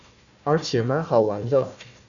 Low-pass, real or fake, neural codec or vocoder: 7.2 kHz; fake; codec, 16 kHz, 1 kbps, FunCodec, trained on Chinese and English, 50 frames a second